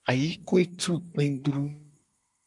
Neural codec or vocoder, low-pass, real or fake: codec, 24 kHz, 1 kbps, SNAC; 10.8 kHz; fake